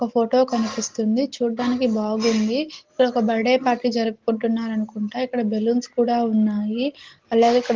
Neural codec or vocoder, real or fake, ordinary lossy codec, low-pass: none; real; Opus, 16 kbps; 7.2 kHz